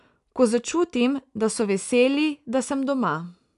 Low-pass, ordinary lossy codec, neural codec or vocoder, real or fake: 10.8 kHz; none; none; real